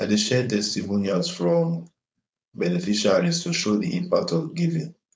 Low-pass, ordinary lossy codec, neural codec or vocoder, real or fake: none; none; codec, 16 kHz, 4.8 kbps, FACodec; fake